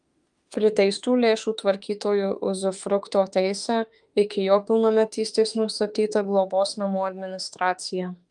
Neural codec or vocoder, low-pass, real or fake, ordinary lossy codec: autoencoder, 48 kHz, 32 numbers a frame, DAC-VAE, trained on Japanese speech; 10.8 kHz; fake; Opus, 32 kbps